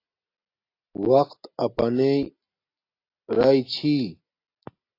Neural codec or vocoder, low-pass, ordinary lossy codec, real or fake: none; 5.4 kHz; AAC, 32 kbps; real